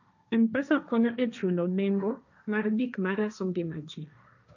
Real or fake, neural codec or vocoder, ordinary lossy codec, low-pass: fake; codec, 16 kHz, 1.1 kbps, Voila-Tokenizer; none; 7.2 kHz